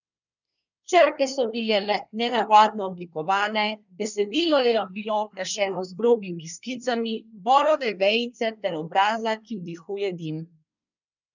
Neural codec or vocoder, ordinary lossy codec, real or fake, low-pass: codec, 24 kHz, 1 kbps, SNAC; none; fake; 7.2 kHz